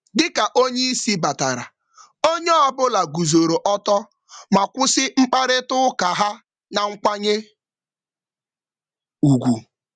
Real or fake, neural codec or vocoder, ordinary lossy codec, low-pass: real; none; none; 9.9 kHz